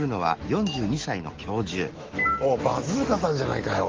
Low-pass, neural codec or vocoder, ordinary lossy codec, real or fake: 7.2 kHz; autoencoder, 48 kHz, 128 numbers a frame, DAC-VAE, trained on Japanese speech; Opus, 16 kbps; fake